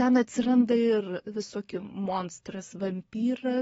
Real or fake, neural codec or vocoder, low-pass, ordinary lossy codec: fake; codec, 44.1 kHz, 7.8 kbps, DAC; 19.8 kHz; AAC, 24 kbps